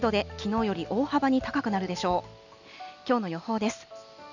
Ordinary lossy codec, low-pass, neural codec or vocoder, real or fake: none; 7.2 kHz; none; real